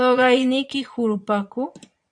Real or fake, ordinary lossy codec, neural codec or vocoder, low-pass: fake; AAC, 64 kbps; vocoder, 22.05 kHz, 80 mel bands, Vocos; 9.9 kHz